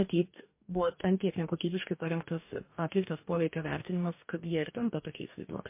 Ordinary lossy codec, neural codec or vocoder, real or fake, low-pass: MP3, 24 kbps; codec, 44.1 kHz, 2.6 kbps, DAC; fake; 3.6 kHz